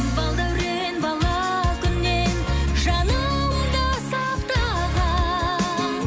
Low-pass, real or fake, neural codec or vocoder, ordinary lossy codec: none; real; none; none